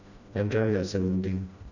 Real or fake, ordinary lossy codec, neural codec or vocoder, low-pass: fake; none; codec, 16 kHz, 1 kbps, FreqCodec, smaller model; 7.2 kHz